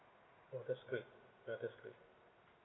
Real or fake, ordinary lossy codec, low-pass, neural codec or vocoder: real; AAC, 16 kbps; 7.2 kHz; none